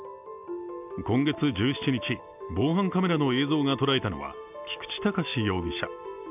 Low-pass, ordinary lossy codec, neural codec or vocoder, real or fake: 3.6 kHz; none; none; real